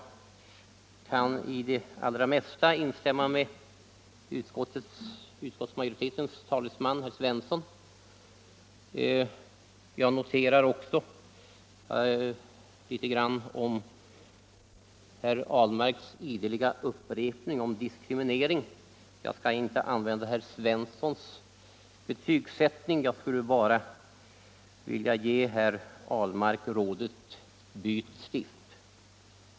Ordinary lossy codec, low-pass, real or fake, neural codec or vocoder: none; none; real; none